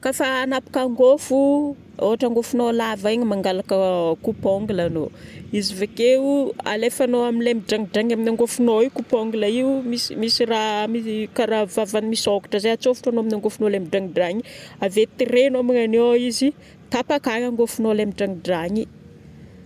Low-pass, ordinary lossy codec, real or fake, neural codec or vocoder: 14.4 kHz; none; real; none